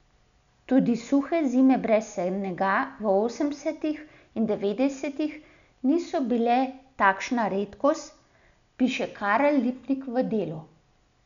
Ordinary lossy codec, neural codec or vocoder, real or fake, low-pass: none; none; real; 7.2 kHz